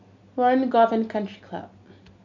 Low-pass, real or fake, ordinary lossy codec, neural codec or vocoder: 7.2 kHz; real; MP3, 48 kbps; none